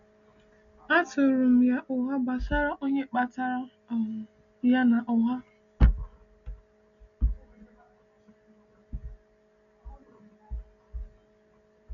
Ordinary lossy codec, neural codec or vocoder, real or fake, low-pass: none; none; real; 7.2 kHz